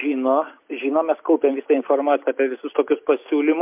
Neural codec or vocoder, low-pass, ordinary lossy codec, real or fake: none; 3.6 kHz; MP3, 32 kbps; real